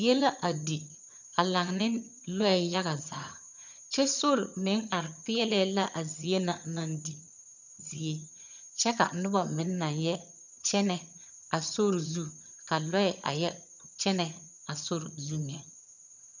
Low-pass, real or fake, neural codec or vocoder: 7.2 kHz; fake; vocoder, 22.05 kHz, 80 mel bands, HiFi-GAN